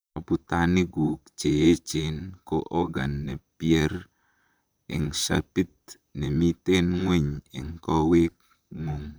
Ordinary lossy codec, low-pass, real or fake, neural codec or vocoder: none; none; fake; vocoder, 44.1 kHz, 128 mel bands, Pupu-Vocoder